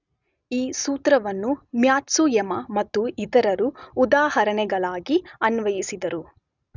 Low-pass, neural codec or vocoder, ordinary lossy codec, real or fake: 7.2 kHz; none; none; real